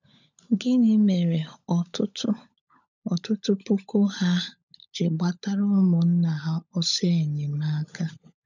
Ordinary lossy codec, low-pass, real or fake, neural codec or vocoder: none; 7.2 kHz; fake; codec, 16 kHz, 16 kbps, FunCodec, trained on LibriTTS, 50 frames a second